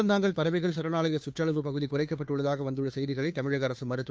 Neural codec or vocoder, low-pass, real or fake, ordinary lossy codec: codec, 16 kHz, 2 kbps, FunCodec, trained on Chinese and English, 25 frames a second; none; fake; none